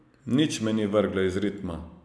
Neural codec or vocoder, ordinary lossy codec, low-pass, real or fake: none; none; none; real